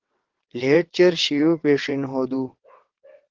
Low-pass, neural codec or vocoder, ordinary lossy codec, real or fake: 7.2 kHz; vocoder, 22.05 kHz, 80 mel bands, WaveNeXt; Opus, 16 kbps; fake